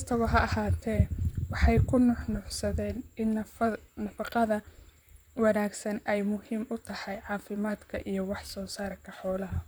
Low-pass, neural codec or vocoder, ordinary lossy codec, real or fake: none; vocoder, 44.1 kHz, 128 mel bands, Pupu-Vocoder; none; fake